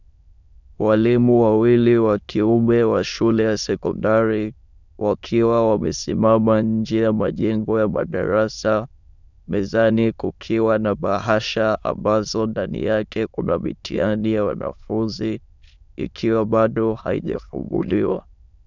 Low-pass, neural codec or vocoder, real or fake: 7.2 kHz; autoencoder, 22.05 kHz, a latent of 192 numbers a frame, VITS, trained on many speakers; fake